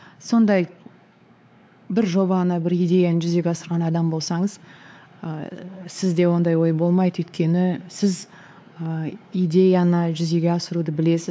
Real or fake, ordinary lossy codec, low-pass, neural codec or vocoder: fake; none; none; codec, 16 kHz, 4 kbps, X-Codec, WavLM features, trained on Multilingual LibriSpeech